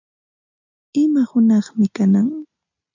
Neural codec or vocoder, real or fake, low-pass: none; real; 7.2 kHz